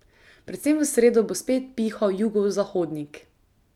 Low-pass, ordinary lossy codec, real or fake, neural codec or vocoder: 19.8 kHz; none; real; none